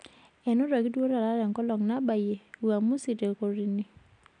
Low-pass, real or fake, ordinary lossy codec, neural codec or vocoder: 9.9 kHz; real; none; none